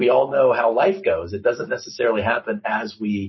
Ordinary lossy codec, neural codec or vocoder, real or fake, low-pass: MP3, 24 kbps; none; real; 7.2 kHz